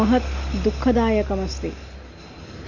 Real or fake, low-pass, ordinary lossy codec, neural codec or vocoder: real; 7.2 kHz; Opus, 64 kbps; none